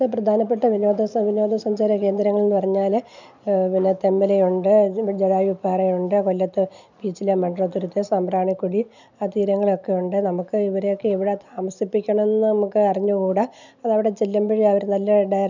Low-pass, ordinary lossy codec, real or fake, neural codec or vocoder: 7.2 kHz; none; real; none